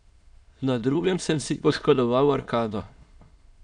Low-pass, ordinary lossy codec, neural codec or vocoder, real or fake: 9.9 kHz; none; autoencoder, 22.05 kHz, a latent of 192 numbers a frame, VITS, trained on many speakers; fake